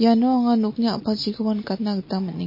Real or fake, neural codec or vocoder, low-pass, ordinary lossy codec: real; none; 5.4 kHz; MP3, 24 kbps